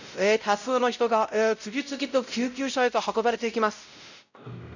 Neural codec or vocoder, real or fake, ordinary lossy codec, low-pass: codec, 16 kHz, 0.5 kbps, X-Codec, WavLM features, trained on Multilingual LibriSpeech; fake; none; 7.2 kHz